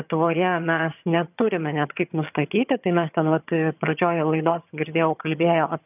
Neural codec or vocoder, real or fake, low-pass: vocoder, 22.05 kHz, 80 mel bands, HiFi-GAN; fake; 3.6 kHz